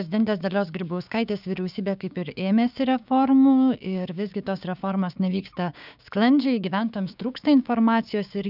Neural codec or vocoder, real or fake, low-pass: codec, 16 kHz, 6 kbps, DAC; fake; 5.4 kHz